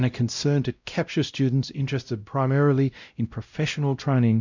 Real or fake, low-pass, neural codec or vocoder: fake; 7.2 kHz; codec, 16 kHz, 0.5 kbps, X-Codec, WavLM features, trained on Multilingual LibriSpeech